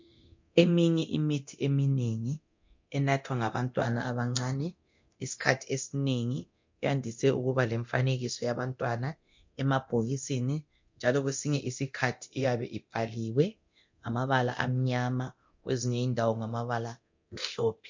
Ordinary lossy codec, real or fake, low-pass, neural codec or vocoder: MP3, 48 kbps; fake; 7.2 kHz; codec, 24 kHz, 0.9 kbps, DualCodec